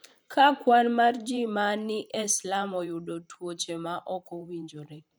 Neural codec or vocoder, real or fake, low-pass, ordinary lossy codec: vocoder, 44.1 kHz, 128 mel bands every 512 samples, BigVGAN v2; fake; none; none